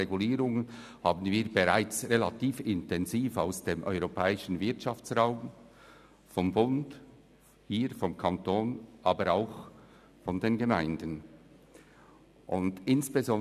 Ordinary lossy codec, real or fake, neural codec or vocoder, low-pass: none; real; none; 14.4 kHz